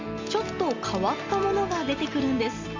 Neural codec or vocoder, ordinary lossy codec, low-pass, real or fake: none; Opus, 32 kbps; 7.2 kHz; real